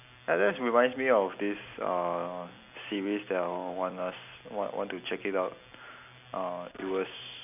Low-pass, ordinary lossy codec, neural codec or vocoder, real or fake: 3.6 kHz; none; none; real